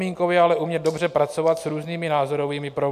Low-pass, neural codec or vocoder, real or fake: 14.4 kHz; none; real